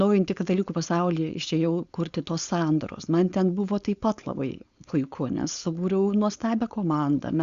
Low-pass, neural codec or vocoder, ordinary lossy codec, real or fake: 7.2 kHz; codec, 16 kHz, 4.8 kbps, FACodec; Opus, 64 kbps; fake